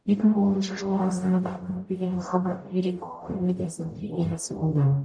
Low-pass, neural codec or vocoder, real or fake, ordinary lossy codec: 9.9 kHz; codec, 44.1 kHz, 0.9 kbps, DAC; fake; none